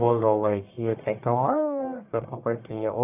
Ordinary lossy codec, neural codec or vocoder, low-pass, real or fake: none; codec, 44.1 kHz, 1.7 kbps, Pupu-Codec; 3.6 kHz; fake